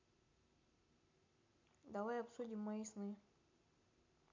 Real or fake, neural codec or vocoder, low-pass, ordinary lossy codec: real; none; 7.2 kHz; MP3, 64 kbps